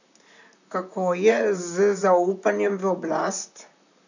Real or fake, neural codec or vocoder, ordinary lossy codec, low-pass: fake; vocoder, 44.1 kHz, 128 mel bands, Pupu-Vocoder; none; 7.2 kHz